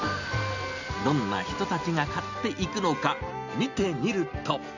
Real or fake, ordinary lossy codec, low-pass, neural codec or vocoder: real; none; 7.2 kHz; none